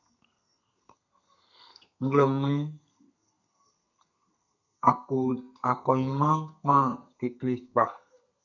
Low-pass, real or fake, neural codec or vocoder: 7.2 kHz; fake; codec, 32 kHz, 1.9 kbps, SNAC